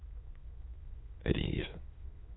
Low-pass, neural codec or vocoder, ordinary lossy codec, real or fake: 7.2 kHz; autoencoder, 22.05 kHz, a latent of 192 numbers a frame, VITS, trained on many speakers; AAC, 16 kbps; fake